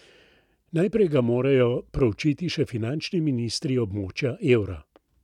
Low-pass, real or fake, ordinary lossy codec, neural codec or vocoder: 19.8 kHz; real; none; none